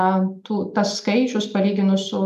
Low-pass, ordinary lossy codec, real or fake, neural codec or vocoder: 14.4 kHz; MP3, 96 kbps; real; none